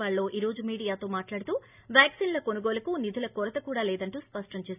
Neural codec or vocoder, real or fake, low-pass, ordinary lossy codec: none; real; 3.6 kHz; none